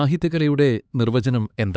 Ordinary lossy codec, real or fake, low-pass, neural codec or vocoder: none; fake; none; codec, 16 kHz, 4 kbps, X-Codec, HuBERT features, trained on LibriSpeech